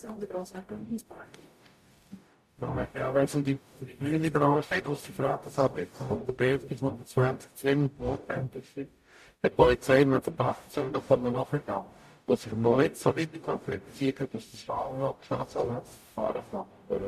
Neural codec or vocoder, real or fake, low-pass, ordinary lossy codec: codec, 44.1 kHz, 0.9 kbps, DAC; fake; 14.4 kHz; MP3, 64 kbps